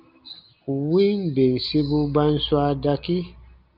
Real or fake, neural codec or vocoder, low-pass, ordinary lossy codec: real; none; 5.4 kHz; Opus, 24 kbps